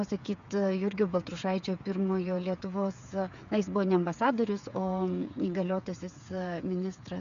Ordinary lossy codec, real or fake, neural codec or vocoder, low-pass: AAC, 96 kbps; fake; codec, 16 kHz, 8 kbps, FreqCodec, smaller model; 7.2 kHz